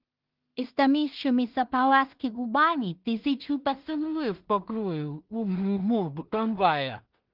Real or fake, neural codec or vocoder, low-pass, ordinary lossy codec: fake; codec, 16 kHz in and 24 kHz out, 0.4 kbps, LongCat-Audio-Codec, two codebook decoder; 5.4 kHz; Opus, 32 kbps